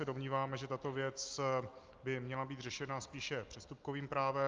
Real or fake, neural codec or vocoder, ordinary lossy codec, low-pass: real; none; Opus, 32 kbps; 7.2 kHz